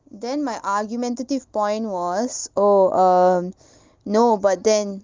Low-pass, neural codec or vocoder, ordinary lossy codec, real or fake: 7.2 kHz; none; Opus, 24 kbps; real